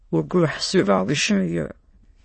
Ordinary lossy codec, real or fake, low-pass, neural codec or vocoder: MP3, 32 kbps; fake; 9.9 kHz; autoencoder, 22.05 kHz, a latent of 192 numbers a frame, VITS, trained on many speakers